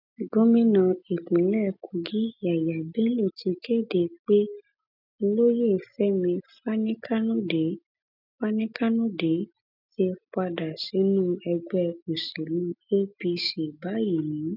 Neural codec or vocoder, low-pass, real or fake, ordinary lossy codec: none; 5.4 kHz; real; none